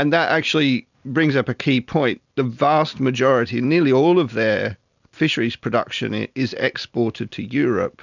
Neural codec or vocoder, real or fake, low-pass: none; real; 7.2 kHz